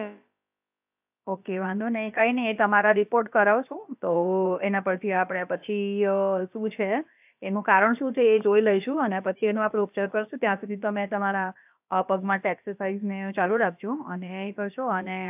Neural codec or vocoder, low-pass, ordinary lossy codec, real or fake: codec, 16 kHz, about 1 kbps, DyCAST, with the encoder's durations; 3.6 kHz; AAC, 32 kbps; fake